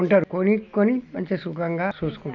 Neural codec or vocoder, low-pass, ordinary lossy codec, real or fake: none; 7.2 kHz; none; real